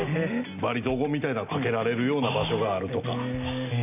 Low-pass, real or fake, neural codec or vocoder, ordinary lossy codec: 3.6 kHz; real; none; none